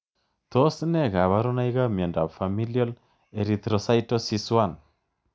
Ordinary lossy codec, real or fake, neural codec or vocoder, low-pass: none; real; none; none